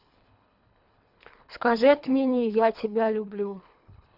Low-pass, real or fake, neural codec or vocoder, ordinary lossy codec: 5.4 kHz; fake; codec, 24 kHz, 3 kbps, HILCodec; none